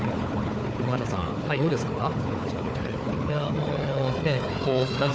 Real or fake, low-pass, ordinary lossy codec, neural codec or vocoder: fake; none; none; codec, 16 kHz, 4 kbps, FunCodec, trained on Chinese and English, 50 frames a second